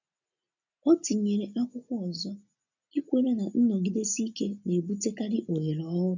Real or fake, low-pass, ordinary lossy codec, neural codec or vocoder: real; 7.2 kHz; none; none